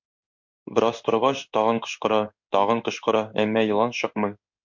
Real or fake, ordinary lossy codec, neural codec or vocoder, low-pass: fake; MP3, 48 kbps; codec, 16 kHz in and 24 kHz out, 1 kbps, XY-Tokenizer; 7.2 kHz